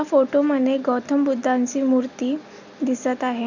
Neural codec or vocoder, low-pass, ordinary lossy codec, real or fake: none; 7.2 kHz; none; real